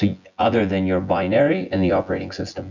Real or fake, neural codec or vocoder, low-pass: fake; vocoder, 24 kHz, 100 mel bands, Vocos; 7.2 kHz